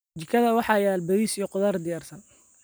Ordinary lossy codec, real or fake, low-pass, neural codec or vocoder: none; real; none; none